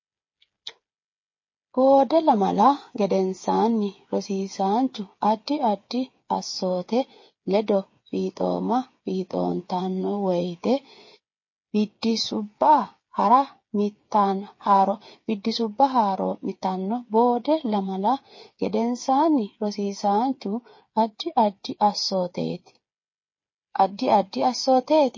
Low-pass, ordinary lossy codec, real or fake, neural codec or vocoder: 7.2 kHz; MP3, 32 kbps; fake; codec, 16 kHz, 8 kbps, FreqCodec, smaller model